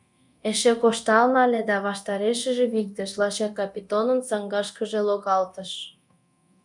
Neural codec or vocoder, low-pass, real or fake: codec, 24 kHz, 0.9 kbps, DualCodec; 10.8 kHz; fake